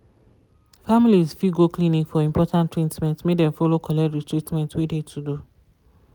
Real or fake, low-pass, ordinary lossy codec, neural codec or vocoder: real; none; none; none